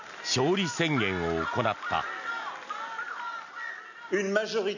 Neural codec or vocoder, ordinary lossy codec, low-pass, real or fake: none; none; 7.2 kHz; real